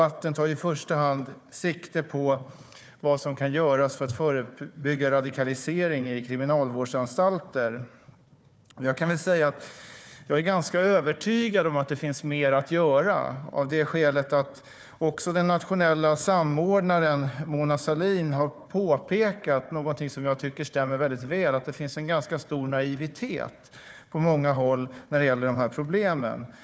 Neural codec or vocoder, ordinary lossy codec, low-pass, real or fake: codec, 16 kHz, 4 kbps, FunCodec, trained on Chinese and English, 50 frames a second; none; none; fake